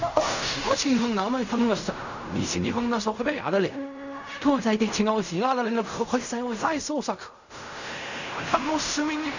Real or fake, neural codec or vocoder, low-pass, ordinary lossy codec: fake; codec, 16 kHz in and 24 kHz out, 0.4 kbps, LongCat-Audio-Codec, fine tuned four codebook decoder; 7.2 kHz; none